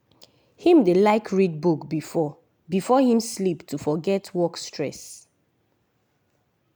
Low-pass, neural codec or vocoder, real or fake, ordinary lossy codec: none; none; real; none